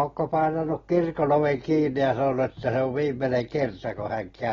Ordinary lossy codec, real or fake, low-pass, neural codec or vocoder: AAC, 24 kbps; real; 7.2 kHz; none